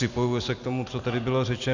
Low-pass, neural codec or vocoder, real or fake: 7.2 kHz; none; real